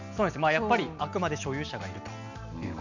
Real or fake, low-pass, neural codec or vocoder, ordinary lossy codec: real; 7.2 kHz; none; none